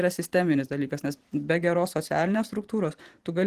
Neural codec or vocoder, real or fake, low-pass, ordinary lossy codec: vocoder, 44.1 kHz, 128 mel bands every 512 samples, BigVGAN v2; fake; 14.4 kHz; Opus, 16 kbps